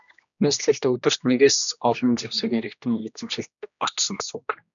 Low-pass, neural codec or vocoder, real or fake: 7.2 kHz; codec, 16 kHz, 1 kbps, X-Codec, HuBERT features, trained on general audio; fake